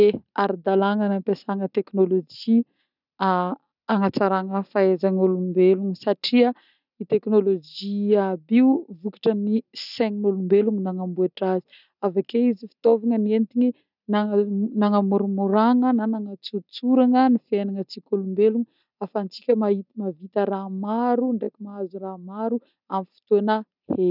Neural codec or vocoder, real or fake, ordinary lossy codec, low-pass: none; real; none; 5.4 kHz